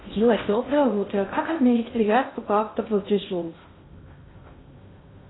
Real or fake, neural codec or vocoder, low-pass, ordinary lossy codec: fake; codec, 16 kHz in and 24 kHz out, 0.6 kbps, FocalCodec, streaming, 4096 codes; 7.2 kHz; AAC, 16 kbps